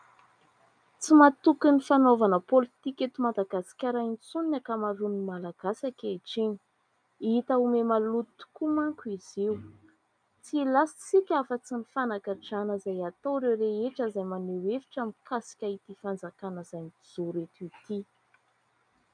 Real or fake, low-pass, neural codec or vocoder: real; 9.9 kHz; none